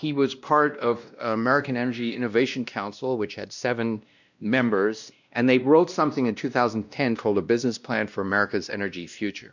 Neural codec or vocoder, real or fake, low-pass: codec, 16 kHz, 1 kbps, X-Codec, WavLM features, trained on Multilingual LibriSpeech; fake; 7.2 kHz